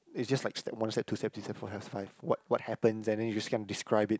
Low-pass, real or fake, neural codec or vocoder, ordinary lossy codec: none; real; none; none